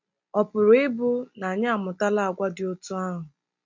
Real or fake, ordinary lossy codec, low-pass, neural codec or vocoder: real; MP3, 64 kbps; 7.2 kHz; none